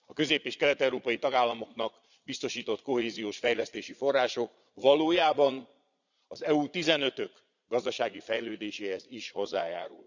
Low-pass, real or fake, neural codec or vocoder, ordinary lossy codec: 7.2 kHz; fake; vocoder, 22.05 kHz, 80 mel bands, Vocos; none